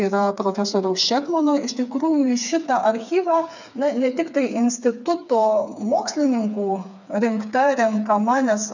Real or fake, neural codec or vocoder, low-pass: fake; codec, 16 kHz, 4 kbps, FreqCodec, smaller model; 7.2 kHz